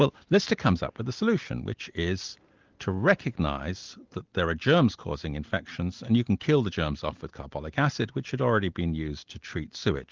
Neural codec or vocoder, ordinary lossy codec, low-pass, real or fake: none; Opus, 32 kbps; 7.2 kHz; real